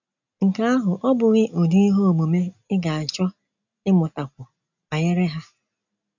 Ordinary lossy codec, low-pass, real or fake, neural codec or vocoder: none; 7.2 kHz; real; none